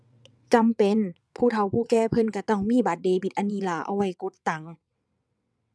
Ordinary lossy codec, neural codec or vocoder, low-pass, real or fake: none; vocoder, 22.05 kHz, 80 mel bands, WaveNeXt; none; fake